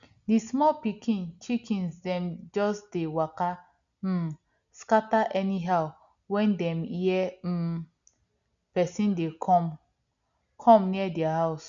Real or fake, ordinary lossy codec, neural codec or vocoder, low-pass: real; none; none; 7.2 kHz